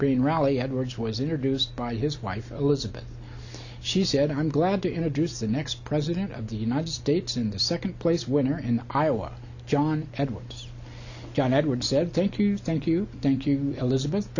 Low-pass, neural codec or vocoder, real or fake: 7.2 kHz; none; real